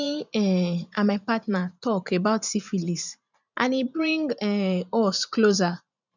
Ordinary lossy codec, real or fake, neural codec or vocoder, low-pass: none; fake; vocoder, 44.1 kHz, 128 mel bands every 512 samples, BigVGAN v2; 7.2 kHz